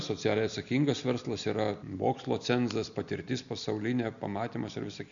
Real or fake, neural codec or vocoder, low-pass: real; none; 7.2 kHz